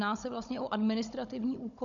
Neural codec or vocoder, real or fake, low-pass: codec, 16 kHz, 16 kbps, FunCodec, trained on Chinese and English, 50 frames a second; fake; 7.2 kHz